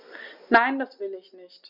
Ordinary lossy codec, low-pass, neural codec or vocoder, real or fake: none; 5.4 kHz; none; real